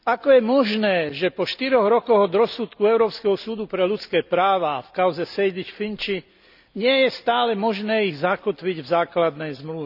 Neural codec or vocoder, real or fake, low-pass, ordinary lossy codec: none; real; 5.4 kHz; none